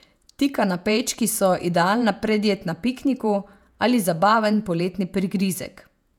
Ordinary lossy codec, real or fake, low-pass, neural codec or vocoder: none; fake; 19.8 kHz; vocoder, 48 kHz, 128 mel bands, Vocos